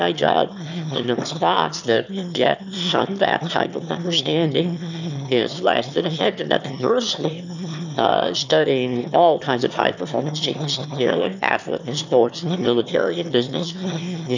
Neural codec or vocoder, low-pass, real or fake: autoencoder, 22.05 kHz, a latent of 192 numbers a frame, VITS, trained on one speaker; 7.2 kHz; fake